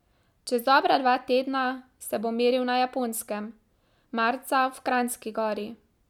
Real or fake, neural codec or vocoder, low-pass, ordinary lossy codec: real; none; 19.8 kHz; none